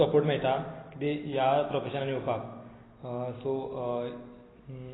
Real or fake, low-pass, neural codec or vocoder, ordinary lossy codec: real; 7.2 kHz; none; AAC, 16 kbps